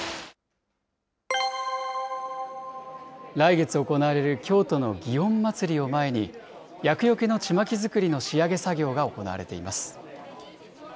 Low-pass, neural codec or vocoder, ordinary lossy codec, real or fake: none; none; none; real